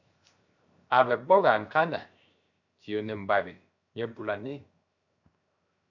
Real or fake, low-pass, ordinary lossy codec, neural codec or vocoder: fake; 7.2 kHz; MP3, 64 kbps; codec, 16 kHz, 0.7 kbps, FocalCodec